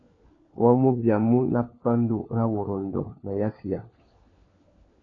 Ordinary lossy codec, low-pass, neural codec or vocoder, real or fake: AAC, 32 kbps; 7.2 kHz; codec, 16 kHz, 4 kbps, FunCodec, trained on LibriTTS, 50 frames a second; fake